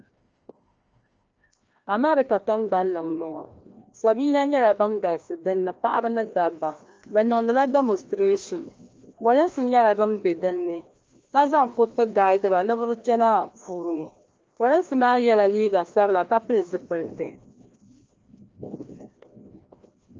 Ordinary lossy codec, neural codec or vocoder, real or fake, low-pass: Opus, 24 kbps; codec, 16 kHz, 1 kbps, FreqCodec, larger model; fake; 7.2 kHz